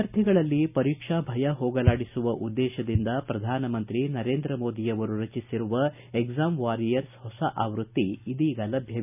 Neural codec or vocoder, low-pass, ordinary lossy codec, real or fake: none; 3.6 kHz; none; real